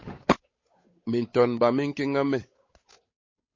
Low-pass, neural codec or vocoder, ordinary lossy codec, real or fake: 7.2 kHz; codec, 16 kHz, 8 kbps, FunCodec, trained on Chinese and English, 25 frames a second; MP3, 32 kbps; fake